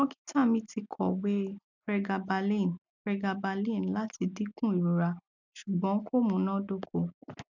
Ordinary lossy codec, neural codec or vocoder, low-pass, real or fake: none; none; 7.2 kHz; real